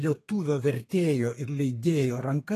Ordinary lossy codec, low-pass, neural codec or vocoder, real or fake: AAC, 48 kbps; 14.4 kHz; codec, 32 kHz, 1.9 kbps, SNAC; fake